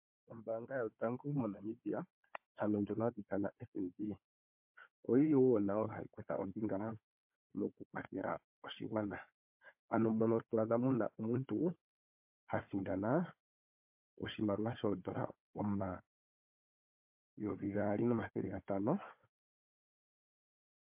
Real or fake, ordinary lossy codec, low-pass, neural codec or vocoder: fake; MP3, 32 kbps; 3.6 kHz; codec, 16 kHz, 4 kbps, FunCodec, trained on Chinese and English, 50 frames a second